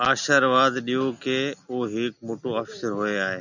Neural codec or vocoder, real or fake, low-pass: none; real; 7.2 kHz